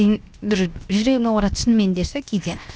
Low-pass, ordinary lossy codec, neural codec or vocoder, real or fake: none; none; codec, 16 kHz, 0.7 kbps, FocalCodec; fake